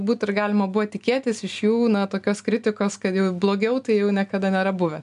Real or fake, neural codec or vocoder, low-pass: real; none; 10.8 kHz